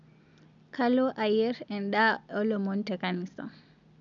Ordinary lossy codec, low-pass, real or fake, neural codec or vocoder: none; 7.2 kHz; real; none